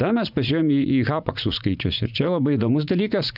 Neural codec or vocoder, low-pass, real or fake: vocoder, 44.1 kHz, 80 mel bands, Vocos; 5.4 kHz; fake